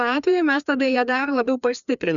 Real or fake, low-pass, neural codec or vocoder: fake; 7.2 kHz; codec, 16 kHz, 2 kbps, FreqCodec, larger model